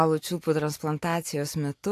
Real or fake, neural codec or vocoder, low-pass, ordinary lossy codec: real; none; 14.4 kHz; AAC, 64 kbps